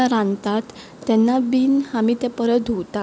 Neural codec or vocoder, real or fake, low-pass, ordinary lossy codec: none; real; none; none